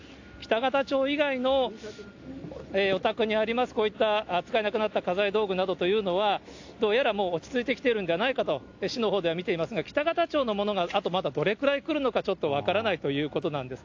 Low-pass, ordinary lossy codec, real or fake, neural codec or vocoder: 7.2 kHz; AAC, 48 kbps; real; none